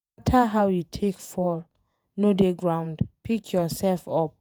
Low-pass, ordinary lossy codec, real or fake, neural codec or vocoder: none; none; fake; vocoder, 48 kHz, 128 mel bands, Vocos